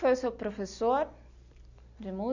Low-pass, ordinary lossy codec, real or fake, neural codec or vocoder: 7.2 kHz; none; real; none